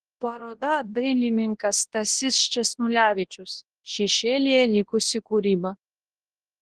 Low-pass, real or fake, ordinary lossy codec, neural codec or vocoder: 10.8 kHz; fake; Opus, 16 kbps; codec, 24 kHz, 0.9 kbps, WavTokenizer, large speech release